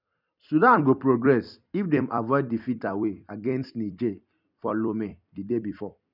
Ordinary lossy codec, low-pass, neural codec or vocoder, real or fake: none; 5.4 kHz; vocoder, 44.1 kHz, 128 mel bands every 256 samples, BigVGAN v2; fake